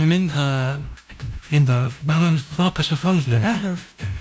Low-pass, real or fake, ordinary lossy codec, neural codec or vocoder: none; fake; none; codec, 16 kHz, 0.5 kbps, FunCodec, trained on LibriTTS, 25 frames a second